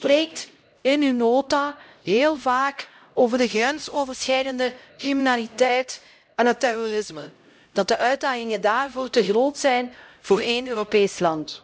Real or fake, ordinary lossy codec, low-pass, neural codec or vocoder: fake; none; none; codec, 16 kHz, 0.5 kbps, X-Codec, HuBERT features, trained on LibriSpeech